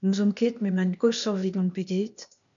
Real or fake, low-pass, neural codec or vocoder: fake; 7.2 kHz; codec, 16 kHz, 0.8 kbps, ZipCodec